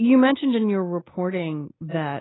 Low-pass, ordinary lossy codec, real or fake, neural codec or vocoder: 7.2 kHz; AAC, 16 kbps; real; none